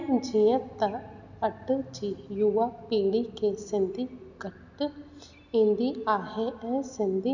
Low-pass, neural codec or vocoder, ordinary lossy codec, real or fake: 7.2 kHz; none; none; real